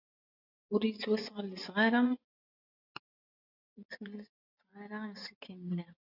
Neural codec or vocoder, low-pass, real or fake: vocoder, 24 kHz, 100 mel bands, Vocos; 5.4 kHz; fake